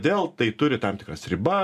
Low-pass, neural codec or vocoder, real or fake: 14.4 kHz; none; real